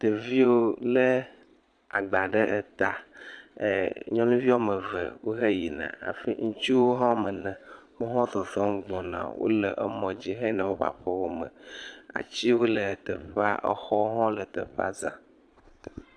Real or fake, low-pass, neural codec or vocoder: fake; 9.9 kHz; vocoder, 22.05 kHz, 80 mel bands, Vocos